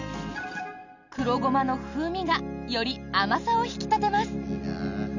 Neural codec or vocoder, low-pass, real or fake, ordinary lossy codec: none; 7.2 kHz; real; none